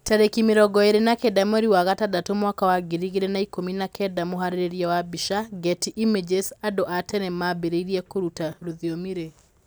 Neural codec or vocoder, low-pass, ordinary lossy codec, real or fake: none; none; none; real